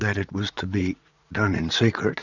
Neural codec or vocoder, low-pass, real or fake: vocoder, 44.1 kHz, 80 mel bands, Vocos; 7.2 kHz; fake